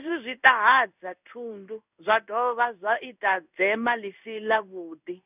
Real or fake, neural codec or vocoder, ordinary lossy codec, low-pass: fake; codec, 16 kHz in and 24 kHz out, 1 kbps, XY-Tokenizer; none; 3.6 kHz